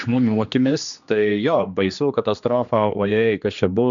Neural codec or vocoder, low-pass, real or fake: codec, 16 kHz, 2 kbps, X-Codec, HuBERT features, trained on general audio; 7.2 kHz; fake